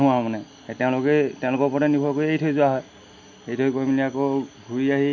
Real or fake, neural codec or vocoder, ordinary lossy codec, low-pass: real; none; none; 7.2 kHz